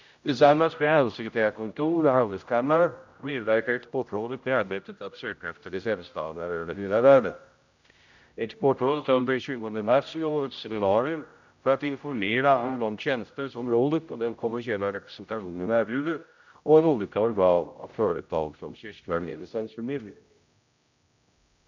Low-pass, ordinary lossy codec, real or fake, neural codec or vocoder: 7.2 kHz; none; fake; codec, 16 kHz, 0.5 kbps, X-Codec, HuBERT features, trained on general audio